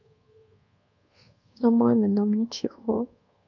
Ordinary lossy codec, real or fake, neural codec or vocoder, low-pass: AAC, 48 kbps; fake; codec, 24 kHz, 1.2 kbps, DualCodec; 7.2 kHz